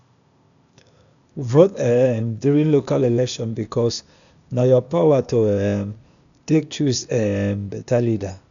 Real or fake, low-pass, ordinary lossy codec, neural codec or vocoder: fake; 7.2 kHz; none; codec, 16 kHz, 0.8 kbps, ZipCodec